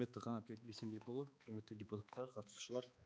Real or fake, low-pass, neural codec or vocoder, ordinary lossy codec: fake; none; codec, 16 kHz, 2 kbps, X-Codec, HuBERT features, trained on balanced general audio; none